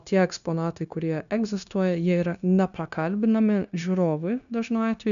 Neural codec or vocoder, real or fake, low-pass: codec, 16 kHz, 0.9 kbps, LongCat-Audio-Codec; fake; 7.2 kHz